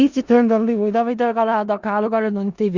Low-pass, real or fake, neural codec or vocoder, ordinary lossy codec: 7.2 kHz; fake; codec, 16 kHz in and 24 kHz out, 0.4 kbps, LongCat-Audio-Codec, four codebook decoder; Opus, 64 kbps